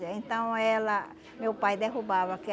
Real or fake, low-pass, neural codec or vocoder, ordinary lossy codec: real; none; none; none